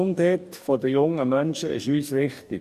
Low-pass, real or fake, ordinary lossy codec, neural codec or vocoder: 14.4 kHz; fake; none; codec, 44.1 kHz, 2.6 kbps, DAC